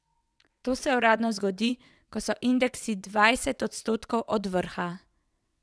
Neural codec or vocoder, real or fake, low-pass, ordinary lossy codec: vocoder, 22.05 kHz, 80 mel bands, WaveNeXt; fake; none; none